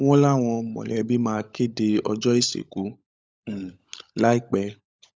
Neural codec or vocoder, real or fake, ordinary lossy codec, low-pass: codec, 16 kHz, 16 kbps, FunCodec, trained on LibriTTS, 50 frames a second; fake; none; none